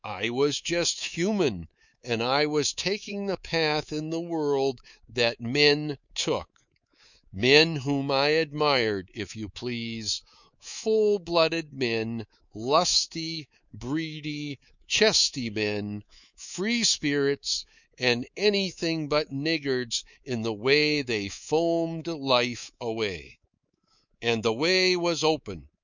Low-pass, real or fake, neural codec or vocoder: 7.2 kHz; fake; codec, 24 kHz, 3.1 kbps, DualCodec